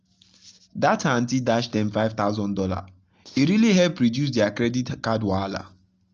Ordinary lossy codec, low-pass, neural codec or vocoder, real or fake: Opus, 24 kbps; 7.2 kHz; none; real